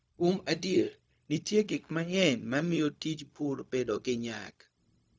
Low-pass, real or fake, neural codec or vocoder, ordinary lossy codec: none; fake; codec, 16 kHz, 0.4 kbps, LongCat-Audio-Codec; none